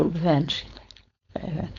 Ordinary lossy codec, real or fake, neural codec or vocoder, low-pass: none; fake; codec, 16 kHz, 4.8 kbps, FACodec; 7.2 kHz